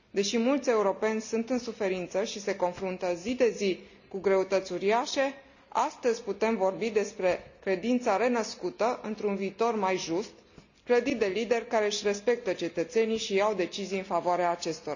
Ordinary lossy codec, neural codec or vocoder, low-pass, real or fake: none; none; 7.2 kHz; real